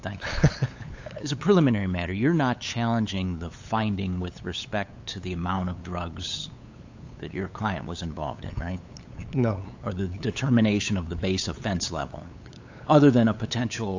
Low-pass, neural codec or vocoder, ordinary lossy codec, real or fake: 7.2 kHz; codec, 16 kHz, 8 kbps, FunCodec, trained on LibriTTS, 25 frames a second; AAC, 48 kbps; fake